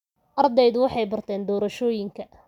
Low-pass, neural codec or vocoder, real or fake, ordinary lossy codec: 19.8 kHz; none; real; none